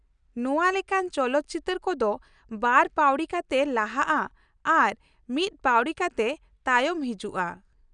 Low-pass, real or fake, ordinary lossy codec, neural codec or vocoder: 9.9 kHz; real; none; none